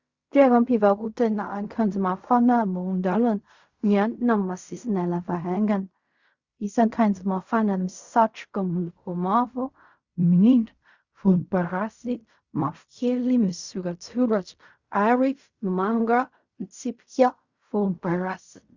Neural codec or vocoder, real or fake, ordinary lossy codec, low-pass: codec, 16 kHz in and 24 kHz out, 0.4 kbps, LongCat-Audio-Codec, fine tuned four codebook decoder; fake; Opus, 64 kbps; 7.2 kHz